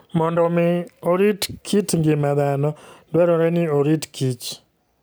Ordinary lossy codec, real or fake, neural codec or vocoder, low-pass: none; real; none; none